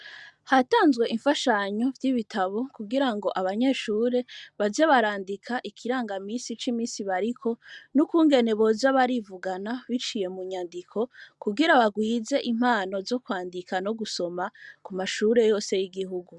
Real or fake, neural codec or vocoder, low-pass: real; none; 10.8 kHz